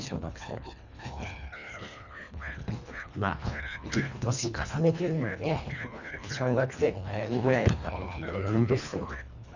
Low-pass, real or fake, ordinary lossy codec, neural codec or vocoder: 7.2 kHz; fake; none; codec, 24 kHz, 1.5 kbps, HILCodec